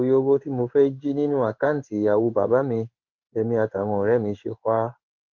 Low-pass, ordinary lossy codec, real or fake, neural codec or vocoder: 7.2 kHz; Opus, 24 kbps; fake; codec, 16 kHz in and 24 kHz out, 1 kbps, XY-Tokenizer